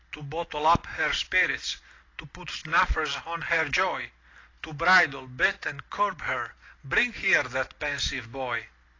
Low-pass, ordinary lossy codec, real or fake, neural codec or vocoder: 7.2 kHz; AAC, 32 kbps; real; none